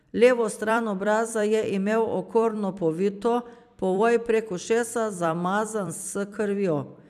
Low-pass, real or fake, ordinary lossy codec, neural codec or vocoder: 14.4 kHz; fake; none; vocoder, 44.1 kHz, 128 mel bands every 256 samples, BigVGAN v2